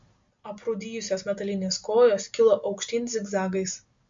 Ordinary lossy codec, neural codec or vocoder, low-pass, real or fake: MP3, 48 kbps; none; 7.2 kHz; real